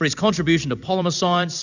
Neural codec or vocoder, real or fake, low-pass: vocoder, 44.1 kHz, 128 mel bands every 256 samples, BigVGAN v2; fake; 7.2 kHz